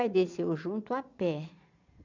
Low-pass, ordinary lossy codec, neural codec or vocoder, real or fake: 7.2 kHz; none; vocoder, 22.05 kHz, 80 mel bands, Vocos; fake